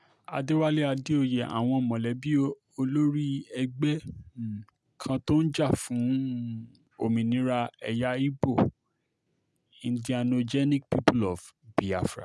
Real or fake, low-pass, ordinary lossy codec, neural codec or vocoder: real; none; none; none